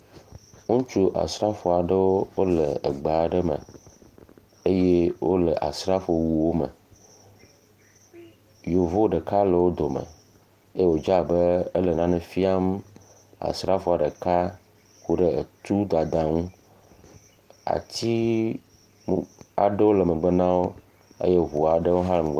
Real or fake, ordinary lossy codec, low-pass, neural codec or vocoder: real; Opus, 24 kbps; 14.4 kHz; none